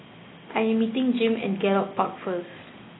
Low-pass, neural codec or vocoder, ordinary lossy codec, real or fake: 7.2 kHz; none; AAC, 16 kbps; real